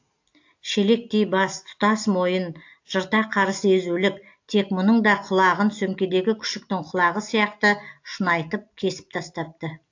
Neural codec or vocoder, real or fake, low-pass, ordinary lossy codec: none; real; 7.2 kHz; AAC, 48 kbps